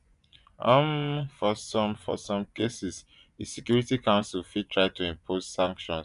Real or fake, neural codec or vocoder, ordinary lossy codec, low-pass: fake; vocoder, 24 kHz, 100 mel bands, Vocos; none; 10.8 kHz